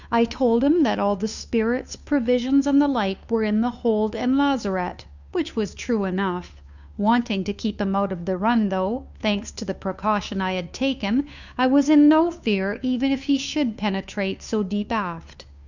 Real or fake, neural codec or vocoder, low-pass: fake; codec, 16 kHz, 2 kbps, FunCodec, trained on Chinese and English, 25 frames a second; 7.2 kHz